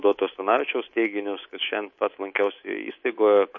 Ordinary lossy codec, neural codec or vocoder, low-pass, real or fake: MP3, 32 kbps; codec, 24 kHz, 3.1 kbps, DualCodec; 7.2 kHz; fake